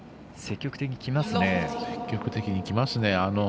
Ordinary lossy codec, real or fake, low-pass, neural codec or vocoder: none; real; none; none